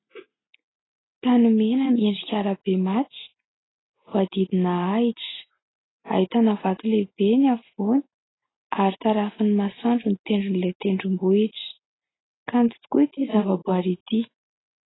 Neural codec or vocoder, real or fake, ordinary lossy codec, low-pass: none; real; AAC, 16 kbps; 7.2 kHz